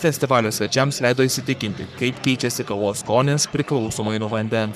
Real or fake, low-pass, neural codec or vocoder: fake; 14.4 kHz; codec, 44.1 kHz, 3.4 kbps, Pupu-Codec